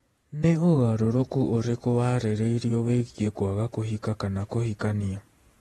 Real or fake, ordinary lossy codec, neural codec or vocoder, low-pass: fake; AAC, 32 kbps; vocoder, 48 kHz, 128 mel bands, Vocos; 19.8 kHz